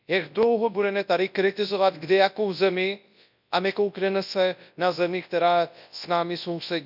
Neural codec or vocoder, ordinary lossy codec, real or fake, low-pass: codec, 24 kHz, 0.9 kbps, WavTokenizer, large speech release; none; fake; 5.4 kHz